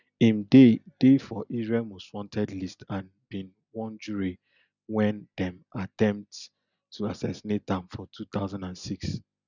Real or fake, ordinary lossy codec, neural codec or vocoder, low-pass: real; none; none; 7.2 kHz